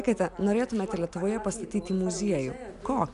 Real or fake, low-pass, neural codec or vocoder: real; 10.8 kHz; none